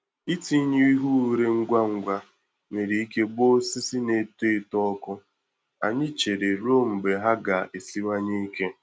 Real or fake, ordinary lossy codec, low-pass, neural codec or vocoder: real; none; none; none